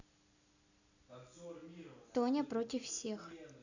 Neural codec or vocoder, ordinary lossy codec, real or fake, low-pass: none; none; real; 7.2 kHz